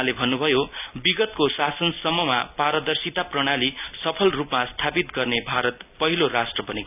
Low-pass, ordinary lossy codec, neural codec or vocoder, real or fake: 3.6 kHz; none; none; real